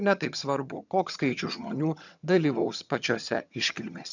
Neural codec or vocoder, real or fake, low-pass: vocoder, 22.05 kHz, 80 mel bands, HiFi-GAN; fake; 7.2 kHz